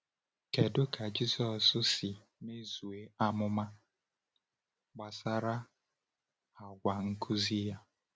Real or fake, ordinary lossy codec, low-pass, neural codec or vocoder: real; none; none; none